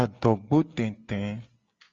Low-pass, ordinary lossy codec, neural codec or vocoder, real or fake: 7.2 kHz; Opus, 32 kbps; none; real